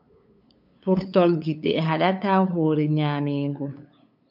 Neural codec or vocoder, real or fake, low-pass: codec, 16 kHz, 8 kbps, FunCodec, trained on LibriTTS, 25 frames a second; fake; 5.4 kHz